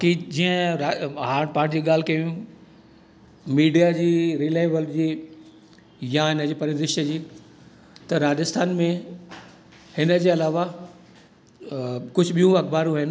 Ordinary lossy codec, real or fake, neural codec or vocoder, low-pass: none; real; none; none